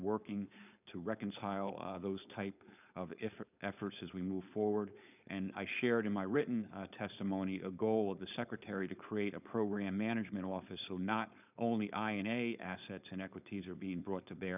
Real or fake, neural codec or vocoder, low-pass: fake; codec, 16 kHz, 4.8 kbps, FACodec; 3.6 kHz